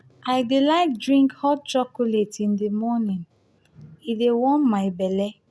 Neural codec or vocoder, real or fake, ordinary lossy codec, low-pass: none; real; none; none